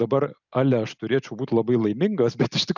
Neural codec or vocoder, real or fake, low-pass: none; real; 7.2 kHz